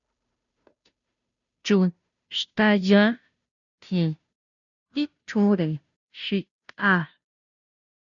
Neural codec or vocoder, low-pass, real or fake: codec, 16 kHz, 0.5 kbps, FunCodec, trained on Chinese and English, 25 frames a second; 7.2 kHz; fake